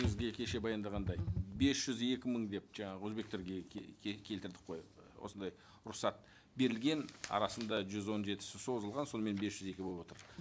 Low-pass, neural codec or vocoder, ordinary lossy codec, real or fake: none; none; none; real